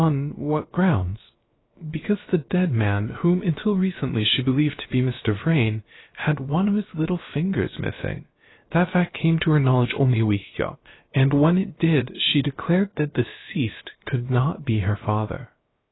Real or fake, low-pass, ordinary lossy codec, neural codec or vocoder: fake; 7.2 kHz; AAC, 16 kbps; codec, 16 kHz, about 1 kbps, DyCAST, with the encoder's durations